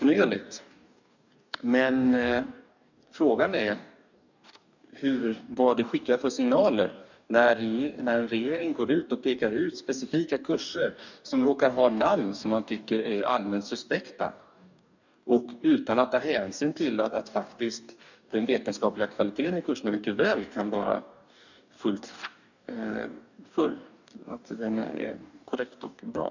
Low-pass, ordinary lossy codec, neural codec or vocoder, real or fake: 7.2 kHz; none; codec, 44.1 kHz, 2.6 kbps, DAC; fake